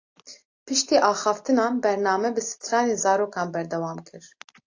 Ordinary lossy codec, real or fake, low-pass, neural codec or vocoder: AAC, 48 kbps; real; 7.2 kHz; none